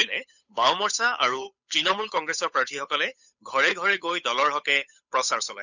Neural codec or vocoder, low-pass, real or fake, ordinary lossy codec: codec, 16 kHz, 8 kbps, FunCodec, trained on Chinese and English, 25 frames a second; 7.2 kHz; fake; none